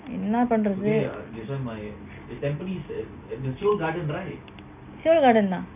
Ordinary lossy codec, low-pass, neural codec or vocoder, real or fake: none; 3.6 kHz; none; real